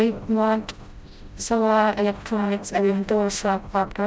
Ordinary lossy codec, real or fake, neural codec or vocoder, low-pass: none; fake; codec, 16 kHz, 0.5 kbps, FreqCodec, smaller model; none